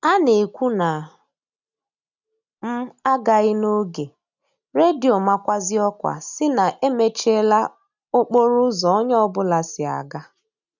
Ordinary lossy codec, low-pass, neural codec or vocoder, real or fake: none; 7.2 kHz; none; real